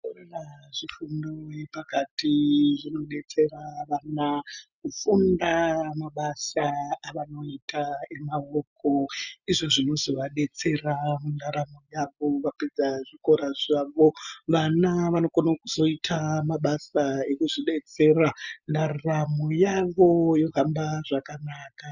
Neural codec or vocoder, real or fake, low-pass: none; real; 7.2 kHz